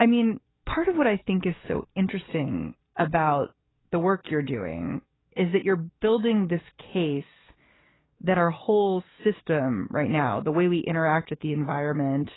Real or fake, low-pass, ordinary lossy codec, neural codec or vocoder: fake; 7.2 kHz; AAC, 16 kbps; codec, 44.1 kHz, 7.8 kbps, DAC